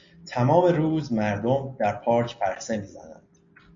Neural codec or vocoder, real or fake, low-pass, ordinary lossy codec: none; real; 7.2 kHz; MP3, 48 kbps